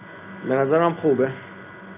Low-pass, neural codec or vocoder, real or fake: 3.6 kHz; none; real